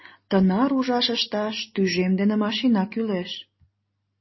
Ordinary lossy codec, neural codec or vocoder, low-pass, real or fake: MP3, 24 kbps; none; 7.2 kHz; real